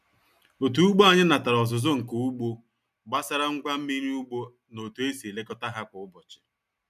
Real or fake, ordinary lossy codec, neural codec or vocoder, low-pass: real; none; none; 14.4 kHz